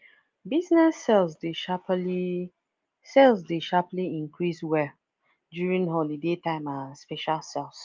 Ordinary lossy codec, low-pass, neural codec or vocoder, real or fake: Opus, 24 kbps; 7.2 kHz; none; real